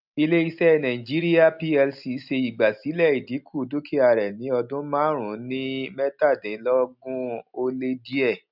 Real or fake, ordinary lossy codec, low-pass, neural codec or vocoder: real; none; 5.4 kHz; none